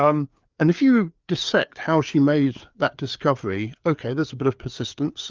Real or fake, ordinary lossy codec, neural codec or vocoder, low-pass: fake; Opus, 24 kbps; codec, 16 kHz, 4 kbps, FreqCodec, larger model; 7.2 kHz